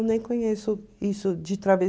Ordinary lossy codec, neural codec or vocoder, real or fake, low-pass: none; none; real; none